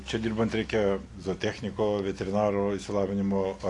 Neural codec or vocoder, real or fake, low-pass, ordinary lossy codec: none; real; 10.8 kHz; AAC, 48 kbps